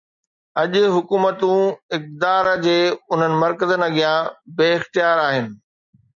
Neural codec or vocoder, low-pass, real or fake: none; 7.2 kHz; real